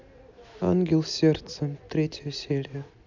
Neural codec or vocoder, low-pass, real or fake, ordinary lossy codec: none; 7.2 kHz; real; MP3, 64 kbps